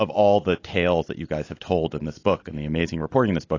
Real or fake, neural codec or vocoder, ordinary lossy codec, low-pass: real; none; AAC, 32 kbps; 7.2 kHz